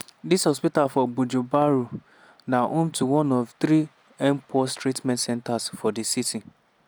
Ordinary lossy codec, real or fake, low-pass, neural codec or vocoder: none; real; none; none